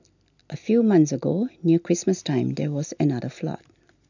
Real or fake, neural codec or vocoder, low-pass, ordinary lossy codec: real; none; 7.2 kHz; none